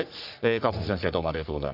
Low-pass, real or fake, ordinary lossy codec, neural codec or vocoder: 5.4 kHz; fake; none; codec, 44.1 kHz, 3.4 kbps, Pupu-Codec